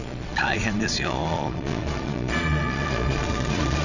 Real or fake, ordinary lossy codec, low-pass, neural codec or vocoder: fake; none; 7.2 kHz; vocoder, 22.05 kHz, 80 mel bands, Vocos